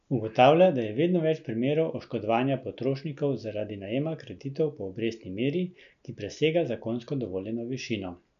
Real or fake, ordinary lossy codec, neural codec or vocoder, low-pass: real; none; none; 7.2 kHz